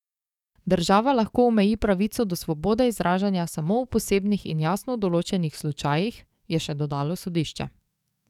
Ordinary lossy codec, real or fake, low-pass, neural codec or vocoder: none; fake; 19.8 kHz; codec, 44.1 kHz, 7.8 kbps, DAC